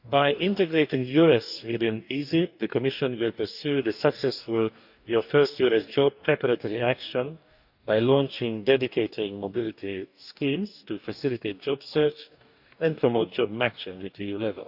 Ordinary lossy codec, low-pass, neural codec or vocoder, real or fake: none; 5.4 kHz; codec, 44.1 kHz, 2.6 kbps, DAC; fake